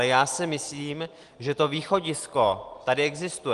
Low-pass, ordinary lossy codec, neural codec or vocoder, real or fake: 10.8 kHz; Opus, 24 kbps; none; real